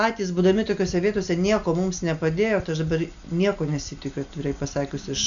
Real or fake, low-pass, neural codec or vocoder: real; 7.2 kHz; none